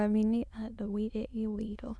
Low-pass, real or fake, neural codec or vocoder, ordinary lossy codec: none; fake; autoencoder, 22.05 kHz, a latent of 192 numbers a frame, VITS, trained on many speakers; none